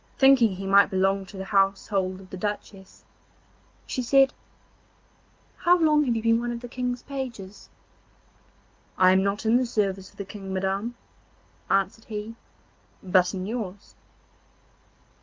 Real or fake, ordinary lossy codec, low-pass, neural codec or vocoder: real; Opus, 24 kbps; 7.2 kHz; none